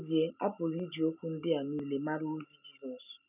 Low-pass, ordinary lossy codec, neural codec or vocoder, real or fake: 3.6 kHz; none; none; real